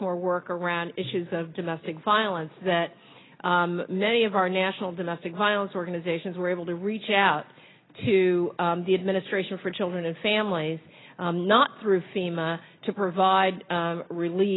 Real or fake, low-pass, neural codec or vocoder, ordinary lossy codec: real; 7.2 kHz; none; AAC, 16 kbps